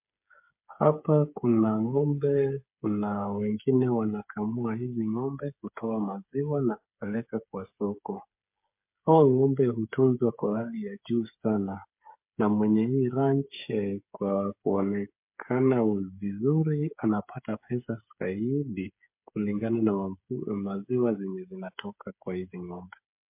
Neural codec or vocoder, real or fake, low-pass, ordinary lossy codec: codec, 16 kHz, 8 kbps, FreqCodec, smaller model; fake; 3.6 kHz; MP3, 32 kbps